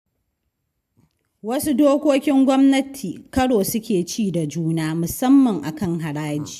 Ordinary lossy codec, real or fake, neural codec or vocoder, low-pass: AAC, 96 kbps; real; none; 14.4 kHz